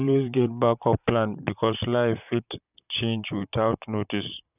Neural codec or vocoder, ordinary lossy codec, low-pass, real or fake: vocoder, 44.1 kHz, 128 mel bands, Pupu-Vocoder; none; 3.6 kHz; fake